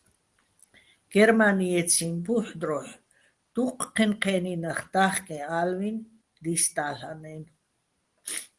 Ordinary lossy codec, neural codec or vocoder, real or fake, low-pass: Opus, 24 kbps; none; real; 10.8 kHz